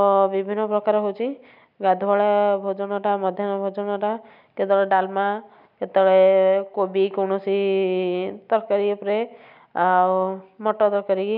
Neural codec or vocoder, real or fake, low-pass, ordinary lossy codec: none; real; 5.4 kHz; none